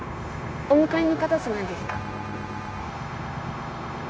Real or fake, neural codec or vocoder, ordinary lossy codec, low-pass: fake; codec, 16 kHz, 0.9 kbps, LongCat-Audio-Codec; none; none